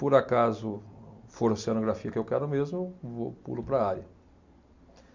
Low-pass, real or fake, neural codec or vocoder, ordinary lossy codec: 7.2 kHz; real; none; MP3, 64 kbps